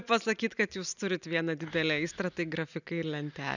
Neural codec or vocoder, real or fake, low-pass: none; real; 7.2 kHz